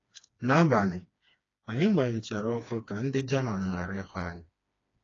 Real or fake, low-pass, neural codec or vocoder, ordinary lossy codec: fake; 7.2 kHz; codec, 16 kHz, 2 kbps, FreqCodec, smaller model; AAC, 32 kbps